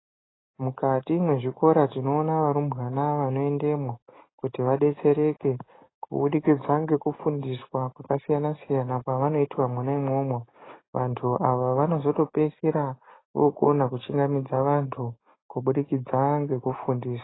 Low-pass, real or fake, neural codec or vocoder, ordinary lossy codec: 7.2 kHz; real; none; AAC, 16 kbps